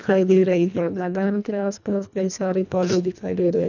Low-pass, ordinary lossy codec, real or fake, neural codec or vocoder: 7.2 kHz; none; fake; codec, 24 kHz, 1.5 kbps, HILCodec